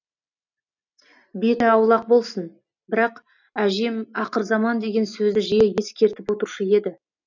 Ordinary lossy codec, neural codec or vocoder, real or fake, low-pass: none; none; real; 7.2 kHz